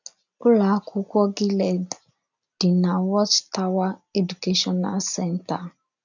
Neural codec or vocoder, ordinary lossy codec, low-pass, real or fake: none; none; 7.2 kHz; real